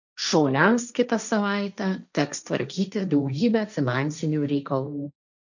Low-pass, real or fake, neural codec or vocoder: 7.2 kHz; fake; codec, 16 kHz, 1.1 kbps, Voila-Tokenizer